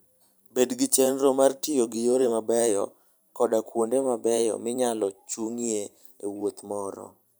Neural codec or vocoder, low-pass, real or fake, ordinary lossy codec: vocoder, 44.1 kHz, 128 mel bands every 512 samples, BigVGAN v2; none; fake; none